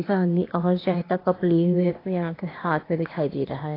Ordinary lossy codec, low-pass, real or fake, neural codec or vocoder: AAC, 24 kbps; 5.4 kHz; fake; codec, 16 kHz, 0.8 kbps, ZipCodec